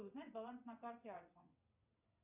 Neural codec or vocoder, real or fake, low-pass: vocoder, 22.05 kHz, 80 mel bands, WaveNeXt; fake; 3.6 kHz